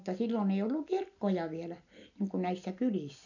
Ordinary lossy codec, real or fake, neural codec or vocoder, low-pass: none; real; none; 7.2 kHz